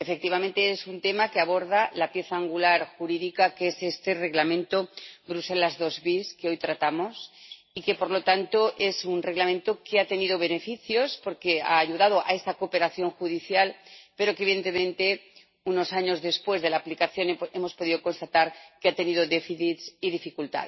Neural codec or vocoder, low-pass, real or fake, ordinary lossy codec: none; 7.2 kHz; real; MP3, 24 kbps